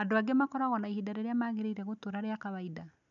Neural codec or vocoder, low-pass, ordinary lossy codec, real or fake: none; 7.2 kHz; none; real